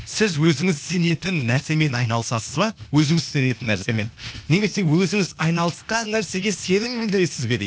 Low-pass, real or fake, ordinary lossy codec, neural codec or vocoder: none; fake; none; codec, 16 kHz, 0.8 kbps, ZipCodec